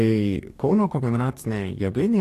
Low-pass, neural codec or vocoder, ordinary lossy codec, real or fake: 14.4 kHz; codec, 44.1 kHz, 2.6 kbps, DAC; AAC, 64 kbps; fake